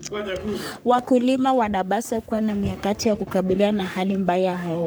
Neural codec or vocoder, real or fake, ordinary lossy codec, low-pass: codec, 44.1 kHz, 3.4 kbps, Pupu-Codec; fake; none; none